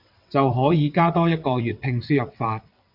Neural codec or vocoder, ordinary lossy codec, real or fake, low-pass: vocoder, 22.05 kHz, 80 mel bands, WaveNeXt; Opus, 64 kbps; fake; 5.4 kHz